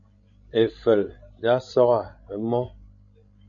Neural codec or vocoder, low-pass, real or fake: codec, 16 kHz, 16 kbps, FreqCodec, larger model; 7.2 kHz; fake